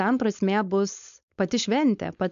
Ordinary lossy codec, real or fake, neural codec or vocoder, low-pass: AAC, 96 kbps; fake; codec, 16 kHz, 4.8 kbps, FACodec; 7.2 kHz